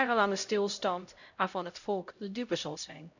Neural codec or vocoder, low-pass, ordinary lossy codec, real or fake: codec, 16 kHz, 0.5 kbps, X-Codec, HuBERT features, trained on LibriSpeech; 7.2 kHz; none; fake